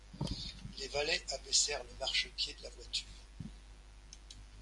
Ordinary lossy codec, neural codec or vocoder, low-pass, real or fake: MP3, 48 kbps; none; 10.8 kHz; real